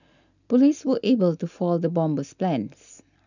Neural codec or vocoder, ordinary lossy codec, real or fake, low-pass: none; none; real; 7.2 kHz